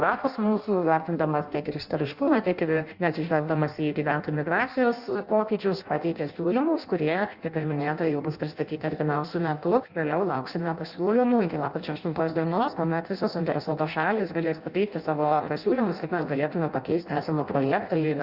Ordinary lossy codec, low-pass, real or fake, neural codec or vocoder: Opus, 64 kbps; 5.4 kHz; fake; codec, 16 kHz in and 24 kHz out, 0.6 kbps, FireRedTTS-2 codec